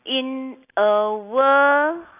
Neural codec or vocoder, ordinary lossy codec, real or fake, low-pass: none; none; real; 3.6 kHz